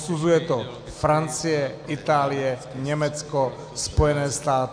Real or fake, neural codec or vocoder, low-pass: real; none; 9.9 kHz